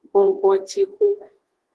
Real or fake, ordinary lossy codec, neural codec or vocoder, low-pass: fake; Opus, 16 kbps; codec, 24 kHz, 1.2 kbps, DualCodec; 10.8 kHz